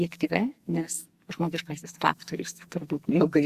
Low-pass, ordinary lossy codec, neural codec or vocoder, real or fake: 14.4 kHz; Opus, 64 kbps; codec, 44.1 kHz, 2.6 kbps, SNAC; fake